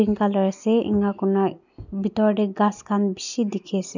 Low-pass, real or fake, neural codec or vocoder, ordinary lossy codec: 7.2 kHz; real; none; none